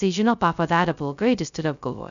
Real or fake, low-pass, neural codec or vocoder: fake; 7.2 kHz; codec, 16 kHz, 0.2 kbps, FocalCodec